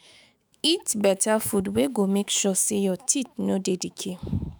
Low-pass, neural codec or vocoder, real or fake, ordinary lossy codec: none; autoencoder, 48 kHz, 128 numbers a frame, DAC-VAE, trained on Japanese speech; fake; none